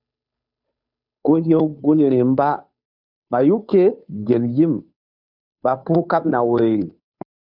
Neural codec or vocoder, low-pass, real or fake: codec, 16 kHz, 2 kbps, FunCodec, trained on Chinese and English, 25 frames a second; 5.4 kHz; fake